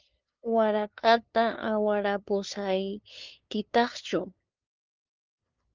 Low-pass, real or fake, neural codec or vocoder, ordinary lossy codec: 7.2 kHz; fake; codec, 16 kHz, 2 kbps, FunCodec, trained on LibriTTS, 25 frames a second; Opus, 24 kbps